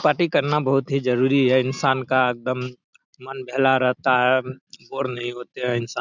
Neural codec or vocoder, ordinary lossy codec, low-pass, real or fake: none; none; 7.2 kHz; real